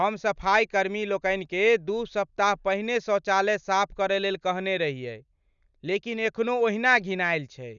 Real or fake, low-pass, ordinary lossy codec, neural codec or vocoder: real; 7.2 kHz; none; none